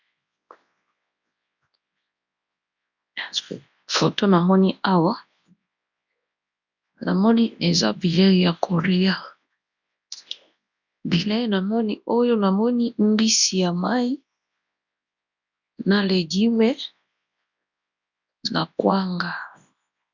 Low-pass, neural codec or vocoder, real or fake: 7.2 kHz; codec, 24 kHz, 0.9 kbps, WavTokenizer, large speech release; fake